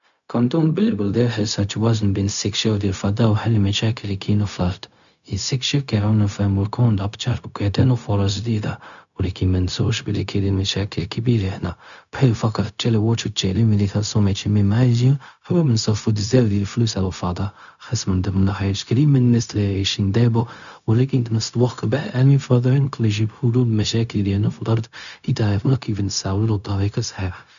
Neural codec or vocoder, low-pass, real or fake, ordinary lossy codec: codec, 16 kHz, 0.4 kbps, LongCat-Audio-Codec; 7.2 kHz; fake; none